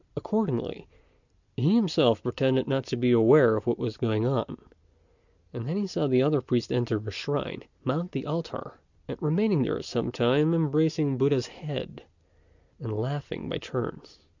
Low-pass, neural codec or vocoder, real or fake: 7.2 kHz; none; real